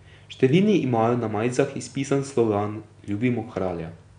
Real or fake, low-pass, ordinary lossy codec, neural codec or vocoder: real; 9.9 kHz; none; none